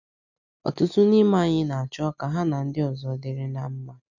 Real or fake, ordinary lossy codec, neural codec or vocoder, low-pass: real; none; none; 7.2 kHz